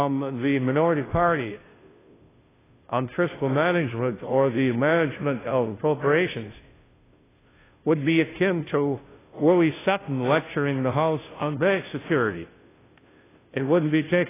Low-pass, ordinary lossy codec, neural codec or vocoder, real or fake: 3.6 kHz; AAC, 16 kbps; codec, 16 kHz, 0.5 kbps, FunCodec, trained on Chinese and English, 25 frames a second; fake